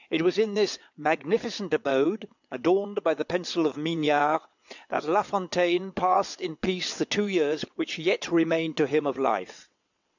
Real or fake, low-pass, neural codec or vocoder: fake; 7.2 kHz; vocoder, 22.05 kHz, 80 mel bands, WaveNeXt